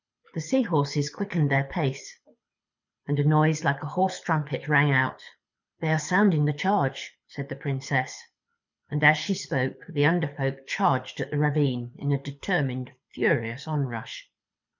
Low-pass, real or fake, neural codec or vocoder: 7.2 kHz; fake; codec, 24 kHz, 6 kbps, HILCodec